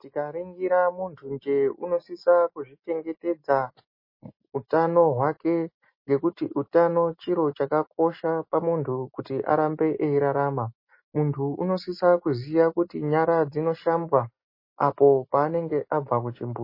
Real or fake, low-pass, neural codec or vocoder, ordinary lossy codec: real; 5.4 kHz; none; MP3, 24 kbps